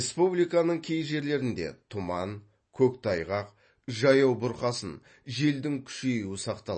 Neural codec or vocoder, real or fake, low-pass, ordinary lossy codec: none; real; 9.9 kHz; MP3, 32 kbps